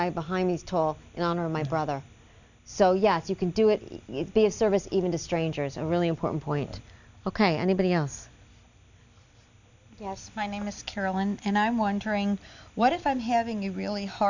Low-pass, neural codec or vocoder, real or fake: 7.2 kHz; none; real